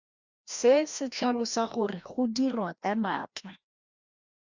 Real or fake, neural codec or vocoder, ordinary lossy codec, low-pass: fake; codec, 16 kHz, 1 kbps, FreqCodec, larger model; Opus, 64 kbps; 7.2 kHz